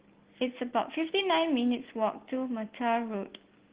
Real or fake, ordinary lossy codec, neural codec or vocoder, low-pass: real; Opus, 16 kbps; none; 3.6 kHz